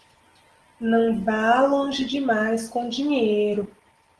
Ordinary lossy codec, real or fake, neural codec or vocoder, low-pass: Opus, 16 kbps; real; none; 10.8 kHz